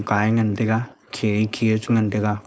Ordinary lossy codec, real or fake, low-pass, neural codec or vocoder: none; fake; none; codec, 16 kHz, 4.8 kbps, FACodec